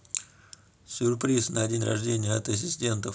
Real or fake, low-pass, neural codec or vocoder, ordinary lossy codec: real; none; none; none